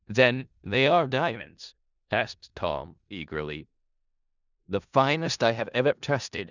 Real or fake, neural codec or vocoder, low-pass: fake; codec, 16 kHz in and 24 kHz out, 0.4 kbps, LongCat-Audio-Codec, four codebook decoder; 7.2 kHz